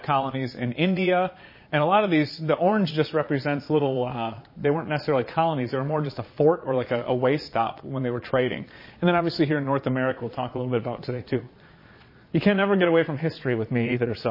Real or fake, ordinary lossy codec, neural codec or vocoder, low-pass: fake; MP3, 24 kbps; vocoder, 22.05 kHz, 80 mel bands, Vocos; 5.4 kHz